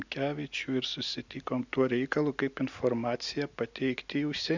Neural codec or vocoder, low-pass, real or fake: none; 7.2 kHz; real